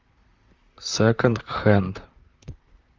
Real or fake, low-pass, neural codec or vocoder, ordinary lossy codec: fake; 7.2 kHz; vocoder, 44.1 kHz, 128 mel bands, Pupu-Vocoder; Opus, 32 kbps